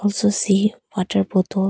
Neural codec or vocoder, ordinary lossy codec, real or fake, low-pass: none; none; real; none